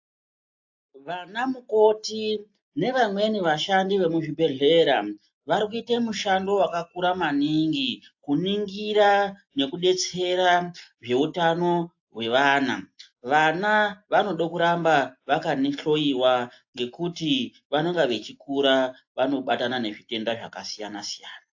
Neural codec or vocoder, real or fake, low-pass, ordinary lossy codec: none; real; 7.2 kHz; AAC, 48 kbps